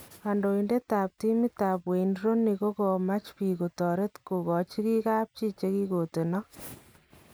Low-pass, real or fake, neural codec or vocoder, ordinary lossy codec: none; real; none; none